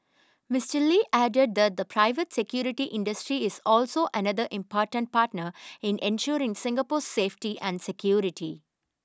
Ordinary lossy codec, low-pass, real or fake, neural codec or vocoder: none; none; real; none